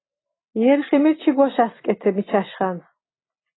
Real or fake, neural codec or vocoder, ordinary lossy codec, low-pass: real; none; AAC, 16 kbps; 7.2 kHz